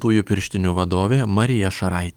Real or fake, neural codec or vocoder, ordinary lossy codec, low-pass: fake; codec, 44.1 kHz, 7.8 kbps, DAC; Opus, 32 kbps; 19.8 kHz